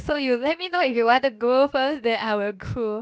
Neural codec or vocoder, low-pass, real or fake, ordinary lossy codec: codec, 16 kHz, about 1 kbps, DyCAST, with the encoder's durations; none; fake; none